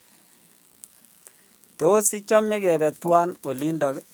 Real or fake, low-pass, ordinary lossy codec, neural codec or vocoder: fake; none; none; codec, 44.1 kHz, 2.6 kbps, SNAC